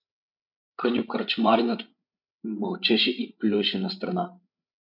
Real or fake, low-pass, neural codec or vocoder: fake; 5.4 kHz; codec, 16 kHz, 16 kbps, FreqCodec, larger model